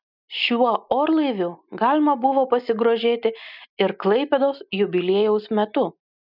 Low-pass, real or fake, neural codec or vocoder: 5.4 kHz; real; none